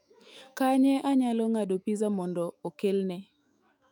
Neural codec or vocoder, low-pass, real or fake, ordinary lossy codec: autoencoder, 48 kHz, 128 numbers a frame, DAC-VAE, trained on Japanese speech; 19.8 kHz; fake; none